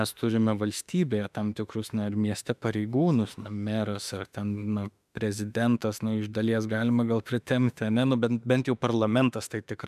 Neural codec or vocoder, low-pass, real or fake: autoencoder, 48 kHz, 32 numbers a frame, DAC-VAE, trained on Japanese speech; 14.4 kHz; fake